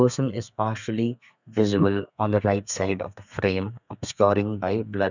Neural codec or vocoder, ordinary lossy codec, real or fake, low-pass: codec, 32 kHz, 1.9 kbps, SNAC; none; fake; 7.2 kHz